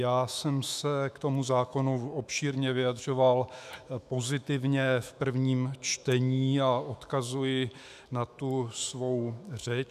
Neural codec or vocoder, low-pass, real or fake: autoencoder, 48 kHz, 128 numbers a frame, DAC-VAE, trained on Japanese speech; 14.4 kHz; fake